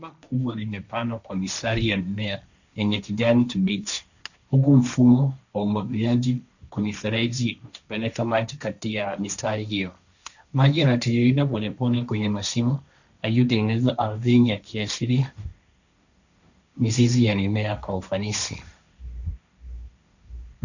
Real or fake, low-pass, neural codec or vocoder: fake; 7.2 kHz; codec, 16 kHz, 1.1 kbps, Voila-Tokenizer